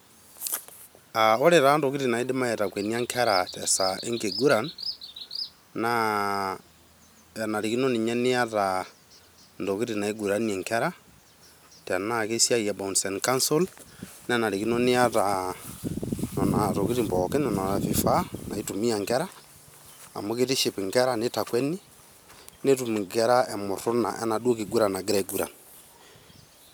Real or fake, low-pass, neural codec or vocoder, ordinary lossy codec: real; none; none; none